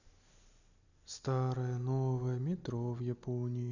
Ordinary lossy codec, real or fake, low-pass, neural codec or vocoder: none; real; 7.2 kHz; none